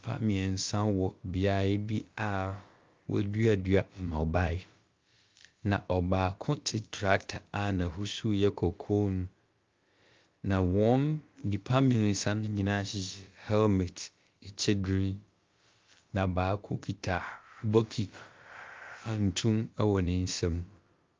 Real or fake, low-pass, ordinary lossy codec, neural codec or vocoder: fake; 7.2 kHz; Opus, 32 kbps; codec, 16 kHz, about 1 kbps, DyCAST, with the encoder's durations